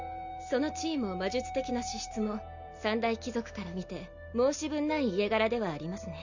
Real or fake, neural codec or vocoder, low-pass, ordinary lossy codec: real; none; 7.2 kHz; none